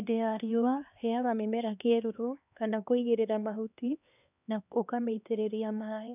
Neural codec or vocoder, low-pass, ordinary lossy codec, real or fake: codec, 16 kHz, 2 kbps, X-Codec, HuBERT features, trained on LibriSpeech; 3.6 kHz; none; fake